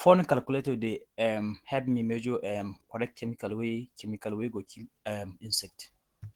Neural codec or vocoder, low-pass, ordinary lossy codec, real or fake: autoencoder, 48 kHz, 128 numbers a frame, DAC-VAE, trained on Japanese speech; 19.8 kHz; Opus, 16 kbps; fake